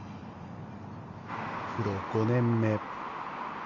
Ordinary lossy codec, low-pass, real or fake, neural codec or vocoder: none; 7.2 kHz; real; none